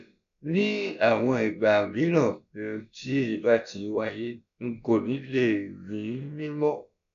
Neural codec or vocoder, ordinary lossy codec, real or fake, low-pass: codec, 16 kHz, about 1 kbps, DyCAST, with the encoder's durations; none; fake; 7.2 kHz